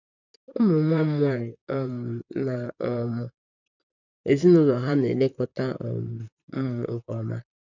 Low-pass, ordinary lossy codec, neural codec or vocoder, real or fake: 7.2 kHz; none; vocoder, 44.1 kHz, 128 mel bands, Pupu-Vocoder; fake